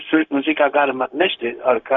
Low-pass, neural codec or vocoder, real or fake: 7.2 kHz; codec, 16 kHz, 0.4 kbps, LongCat-Audio-Codec; fake